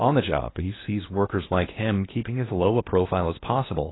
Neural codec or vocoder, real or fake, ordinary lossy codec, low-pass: codec, 16 kHz in and 24 kHz out, 0.8 kbps, FocalCodec, streaming, 65536 codes; fake; AAC, 16 kbps; 7.2 kHz